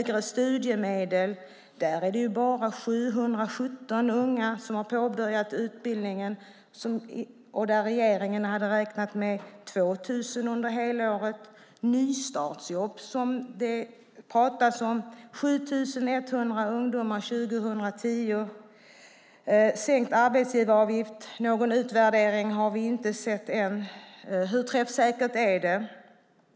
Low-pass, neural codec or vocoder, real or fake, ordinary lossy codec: none; none; real; none